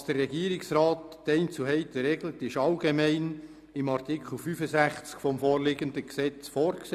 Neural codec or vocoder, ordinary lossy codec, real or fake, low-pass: none; none; real; 14.4 kHz